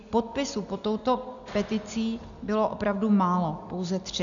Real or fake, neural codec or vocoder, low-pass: real; none; 7.2 kHz